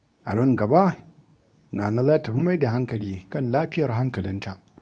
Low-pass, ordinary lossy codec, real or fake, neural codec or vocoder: 9.9 kHz; MP3, 96 kbps; fake; codec, 24 kHz, 0.9 kbps, WavTokenizer, medium speech release version 2